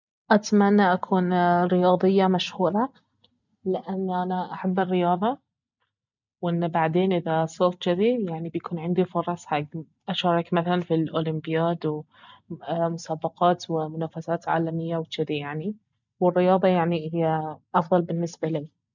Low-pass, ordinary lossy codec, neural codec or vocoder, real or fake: 7.2 kHz; none; none; real